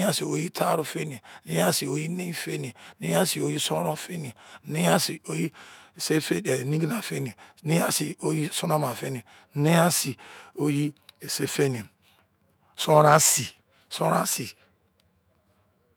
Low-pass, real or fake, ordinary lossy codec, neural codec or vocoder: none; fake; none; autoencoder, 48 kHz, 128 numbers a frame, DAC-VAE, trained on Japanese speech